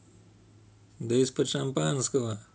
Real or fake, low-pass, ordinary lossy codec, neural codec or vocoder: real; none; none; none